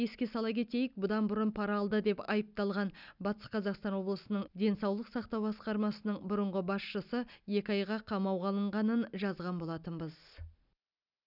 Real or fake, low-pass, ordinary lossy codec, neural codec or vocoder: real; 5.4 kHz; none; none